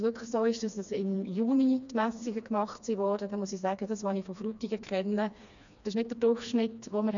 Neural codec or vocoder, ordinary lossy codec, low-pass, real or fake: codec, 16 kHz, 2 kbps, FreqCodec, smaller model; none; 7.2 kHz; fake